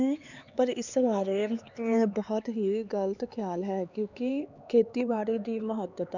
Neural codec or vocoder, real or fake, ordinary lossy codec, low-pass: codec, 16 kHz, 4 kbps, X-Codec, HuBERT features, trained on LibriSpeech; fake; none; 7.2 kHz